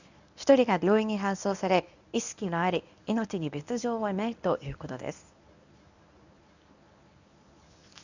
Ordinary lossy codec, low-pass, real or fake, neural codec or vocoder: none; 7.2 kHz; fake; codec, 24 kHz, 0.9 kbps, WavTokenizer, medium speech release version 1